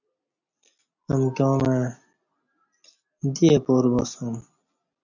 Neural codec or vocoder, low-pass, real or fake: none; 7.2 kHz; real